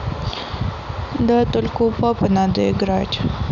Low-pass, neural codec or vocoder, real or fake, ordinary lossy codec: 7.2 kHz; none; real; none